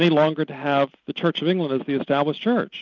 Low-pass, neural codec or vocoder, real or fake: 7.2 kHz; none; real